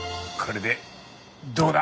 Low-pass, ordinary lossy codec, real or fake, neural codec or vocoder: none; none; real; none